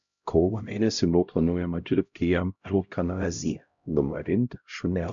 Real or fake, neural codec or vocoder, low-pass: fake; codec, 16 kHz, 0.5 kbps, X-Codec, HuBERT features, trained on LibriSpeech; 7.2 kHz